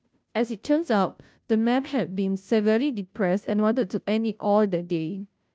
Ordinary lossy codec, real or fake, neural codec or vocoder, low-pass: none; fake; codec, 16 kHz, 0.5 kbps, FunCodec, trained on Chinese and English, 25 frames a second; none